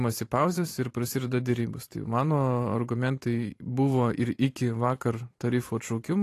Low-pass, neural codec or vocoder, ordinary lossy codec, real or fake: 14.4 kHz; vocoder, 44.1 kHz, 128 mel bands every 512 samples, BigVGAN v2; AAC, 48 kbps; fake